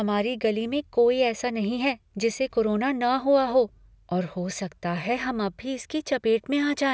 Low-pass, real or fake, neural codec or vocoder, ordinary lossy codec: none; real; none; none